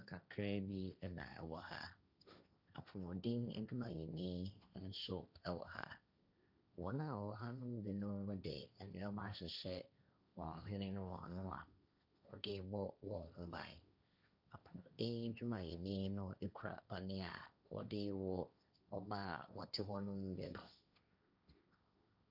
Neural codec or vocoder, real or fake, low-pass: codec, 16 kHz, 1.1 kbps, Voila-Tokenizer; fake; 5.4 kHz